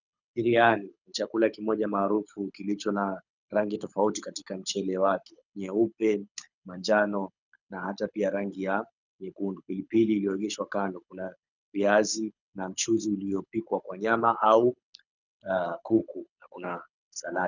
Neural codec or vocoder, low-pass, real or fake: codec, 24 kHz, 6 kbps, HILCodec; 7.2 kHz; fake